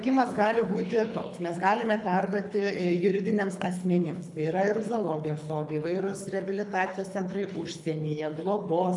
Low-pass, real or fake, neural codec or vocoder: 10.8 kHz; fake; codec, 24 kHz, 3 kbps, HILCodec